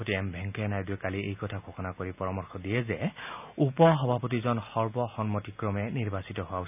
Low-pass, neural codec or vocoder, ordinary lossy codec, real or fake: 3.6 kHz; none; none; real